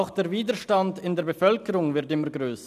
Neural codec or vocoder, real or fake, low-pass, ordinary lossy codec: none; real; 14.4 kHz; none